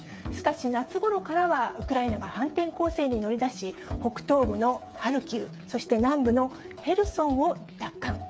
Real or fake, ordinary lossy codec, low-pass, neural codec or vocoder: fake; none; none; codec, 16 kHz, 8 kbps, FreqCodec, smaller model